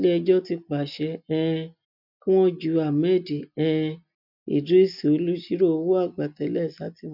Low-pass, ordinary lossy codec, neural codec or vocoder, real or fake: 5.4 kHz; none; none; real